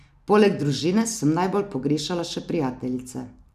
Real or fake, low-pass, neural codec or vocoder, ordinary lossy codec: real; 14.4 kHz; none; none